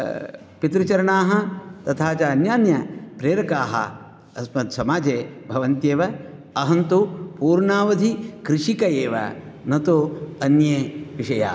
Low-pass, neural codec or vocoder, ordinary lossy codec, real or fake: none; none; none; real